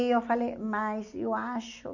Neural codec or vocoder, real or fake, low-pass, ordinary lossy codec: none; real; 7.2 kHz; none